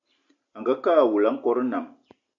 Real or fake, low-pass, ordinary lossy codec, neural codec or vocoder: real; 7.2 kHz; AAC, 64 kbps; none